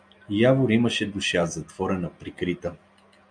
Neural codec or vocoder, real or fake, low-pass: none; real; 9.9 kHz